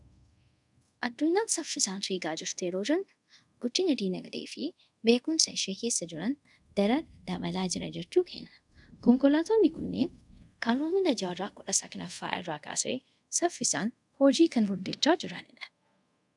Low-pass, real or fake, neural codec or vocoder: 10.8 kHz; fake; codec, 24 kHz, 0.5 kbps, DualCodec